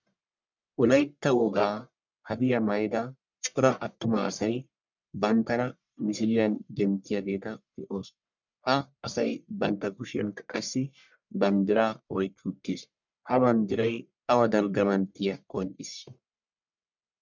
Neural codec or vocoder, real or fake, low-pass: codec, 44.1 kHz, 1.7 kbps, Pupu-Codec; fake; 7.2 kHz